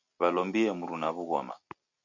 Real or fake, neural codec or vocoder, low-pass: real; none; 7.2 kHz